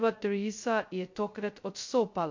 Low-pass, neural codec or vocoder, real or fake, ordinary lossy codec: 7.2 kHz; codec, 16 kHz, 0.2 kbps, FocalCodec; fake; MP3, 48 kbps